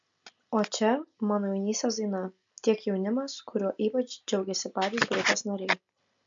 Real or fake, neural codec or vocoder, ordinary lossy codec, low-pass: real; none; MP3, 64 kbps; 7.2 kHz